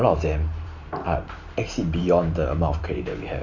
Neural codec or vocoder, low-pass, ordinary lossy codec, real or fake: vocoder, 44.1 kHz, 128 mel bands every 512 samples, BigVGAN v2; 7.2 kHz; none; fake